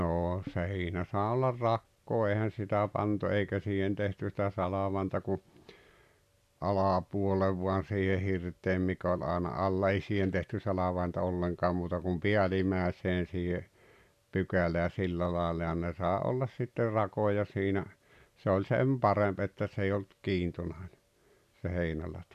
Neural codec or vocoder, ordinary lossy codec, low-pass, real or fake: none; none; 14.4 kHz; real